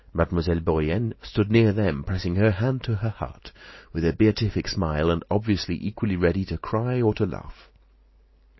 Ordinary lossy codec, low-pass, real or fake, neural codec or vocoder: MP3, 24 kbps; 7.2 kHz; real; none